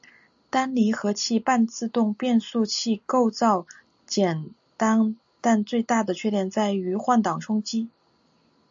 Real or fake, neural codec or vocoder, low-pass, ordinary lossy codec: real; none; 7.2 kHz; AAC, 64 kbps